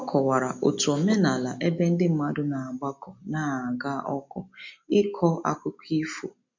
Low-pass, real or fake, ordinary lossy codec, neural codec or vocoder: 7.2 kHz; real; MP3, 48 kbps; none